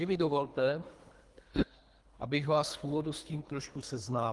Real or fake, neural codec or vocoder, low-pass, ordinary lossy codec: fake; codec, 24 kHz, 3 kbps, HILCodec; 10.8 kHz; Opus, 24 kbps